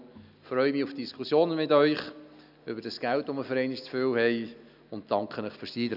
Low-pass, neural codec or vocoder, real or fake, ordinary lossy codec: 5.4 kHz; none; real; none